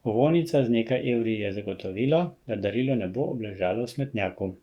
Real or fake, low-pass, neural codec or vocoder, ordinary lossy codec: fake; 19.8 kHz; codec, 44.1 kHz, 7.8 kbps, DAC; Opus, 64 kbps